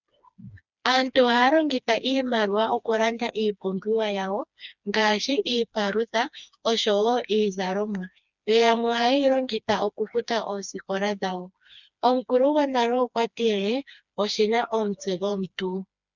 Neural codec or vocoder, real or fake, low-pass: codec, 16 kHz, 2 kbps, FreqCodec, smaller model; fake; 7.2 kHz